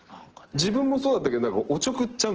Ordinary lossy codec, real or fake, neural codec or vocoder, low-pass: Opus, 16 kbps; real; none; 7.2 kHz